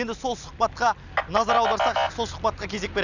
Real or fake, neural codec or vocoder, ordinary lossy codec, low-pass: real; none; none; 7.2 kHz